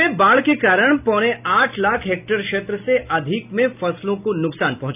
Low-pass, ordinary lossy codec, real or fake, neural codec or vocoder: 3.6 kHz; none; real; none